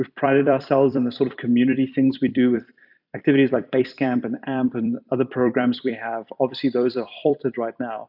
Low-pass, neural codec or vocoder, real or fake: 5.4 kHz; vocoder, 44.1 kHz, 128 mel bands every 256 samples, BigVGAN v2; fake